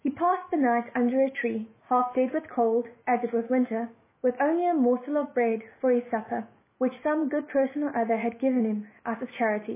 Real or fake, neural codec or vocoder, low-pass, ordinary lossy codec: fake; autoencoder, 48 kHz, 128 numbers a frame, DAC-VAE, trained on Japanese speech; 3.6 kHz; MP3, 16 kbps